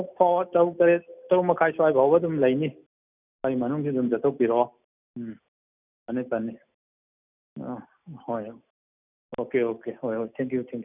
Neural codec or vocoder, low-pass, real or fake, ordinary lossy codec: none; 3.6 kHz; real; none